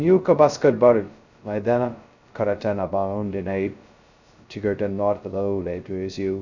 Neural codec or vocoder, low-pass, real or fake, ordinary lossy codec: codec, 16 kHz, 0.2 kbps, FocalCodec; 7.2 kHz; fake; none